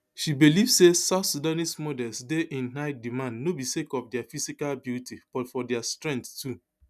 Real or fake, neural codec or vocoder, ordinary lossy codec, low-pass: real; none; none; 14.4 kHz